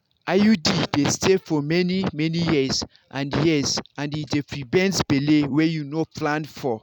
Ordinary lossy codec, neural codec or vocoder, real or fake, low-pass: none; none; real; 19.8 kHz